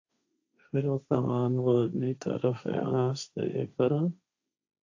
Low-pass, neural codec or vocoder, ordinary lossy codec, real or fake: 7.2 kHz; codec, 16 kHz, 1.1 kbps, Voila-Tokenizer; AAC, 48 kbps; fake